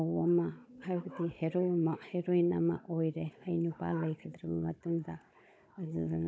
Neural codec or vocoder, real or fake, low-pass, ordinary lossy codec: codec, 16 kHz, 16 kbps, FunCodec, trained on Chinese and English, 50 frames a second; fake; none; none